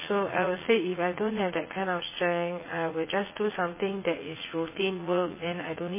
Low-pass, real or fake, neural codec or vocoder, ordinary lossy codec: 3.6 kHz; fake; vocoder, 44.1 kHz, 80 mel bands, Vocos; MP3, 16 kbps